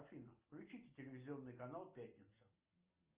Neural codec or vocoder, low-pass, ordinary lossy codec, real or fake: none; 3.6 kHz; Opus, 24 kbps; real